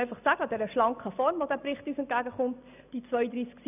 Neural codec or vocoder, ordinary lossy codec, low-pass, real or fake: none; none; 3.6 kHz; real